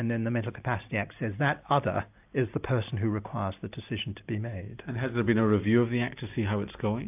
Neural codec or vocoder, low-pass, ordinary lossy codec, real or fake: none; 3.6 kHz; AAC, 32 kbps; real